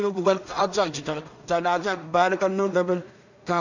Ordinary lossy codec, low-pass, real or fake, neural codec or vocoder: none; 7.2 kHz; fake; codec, 16 kHz in and 24 kHz out, 0.4 kbps, LongCat-Audio-Codec, two codebook decoder